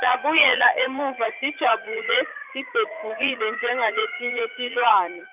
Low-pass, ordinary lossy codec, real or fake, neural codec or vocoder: 3.6 kHz; none; fake; vocoder, 44.1 kHz, 80 mel bands, Vocos